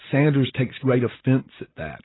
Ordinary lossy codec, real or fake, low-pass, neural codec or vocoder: AAC, 16 kbps; real; 7.2 kHz; none